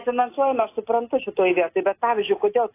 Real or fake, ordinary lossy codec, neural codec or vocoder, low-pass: real; AAC, 24 kbps; none; 3.6 kHz